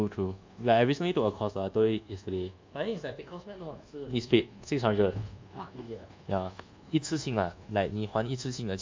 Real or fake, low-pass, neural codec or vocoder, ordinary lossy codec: fake; 7.2 kHz; codec, 24 kHz, 1.2 kbps, DualCodec; MP3, 64 kbps